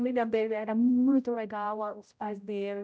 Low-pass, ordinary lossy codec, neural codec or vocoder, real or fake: none; none; codec, 16 kHz, 0.5 kbps, X-Codec, HuBERT features, trained on general audio; fake